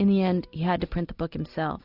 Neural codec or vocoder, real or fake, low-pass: none; real; 5.4 kHz